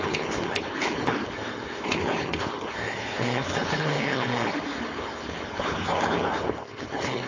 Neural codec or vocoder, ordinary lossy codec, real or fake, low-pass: codec, 16 kHz, 4.8 kbps, FACodec; AAC, 32 kbps; fake; 7.2 kHz